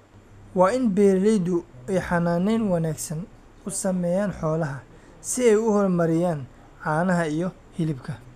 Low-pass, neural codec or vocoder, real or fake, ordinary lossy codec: 14.4 kHz; none; real; none